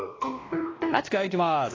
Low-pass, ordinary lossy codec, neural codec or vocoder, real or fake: 7.2 kHz; AAC, 32 kbps; codec, 16 kHz, 1 kbps, X-Codec, WavLM features, trained on Multilingual LibriSpeech; fake